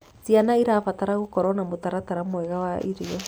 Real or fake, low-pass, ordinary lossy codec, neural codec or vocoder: real; none; none; none